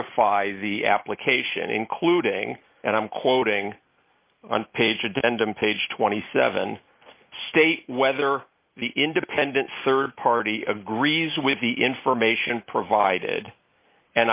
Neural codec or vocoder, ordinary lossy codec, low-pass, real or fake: none; Opus, 24 kbps; 3.6 kHz; real